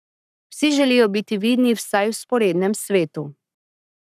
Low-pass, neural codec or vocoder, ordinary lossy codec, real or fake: 14.4 kHz; codec, 44.1 kHz, 7.8 kbps, Pupu-Codec; none; fake